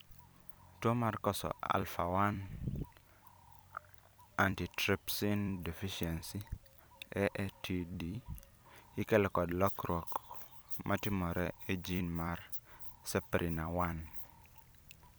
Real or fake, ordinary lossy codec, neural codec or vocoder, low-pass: fake; none; vocoder, 44.1 kHz, 128 mel bands every 256 samples, BigVGAN v2; none